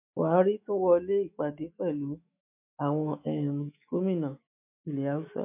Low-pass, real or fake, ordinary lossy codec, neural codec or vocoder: 3.6 kHz; fake; MP3, 32 kbps; autoencoder, 48 kHz, 128 numbers a frame, DAC-VAE, trained on Japanese speech